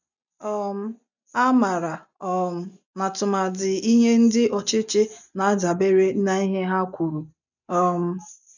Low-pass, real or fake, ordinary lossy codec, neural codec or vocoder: 7.2 kHz; real; none; none